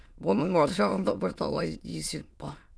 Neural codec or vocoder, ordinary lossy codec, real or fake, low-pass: autoencoder, 22.05 kHz, a latent of 192 numbers a frame, VITS, trained on many speakers; none; fake; none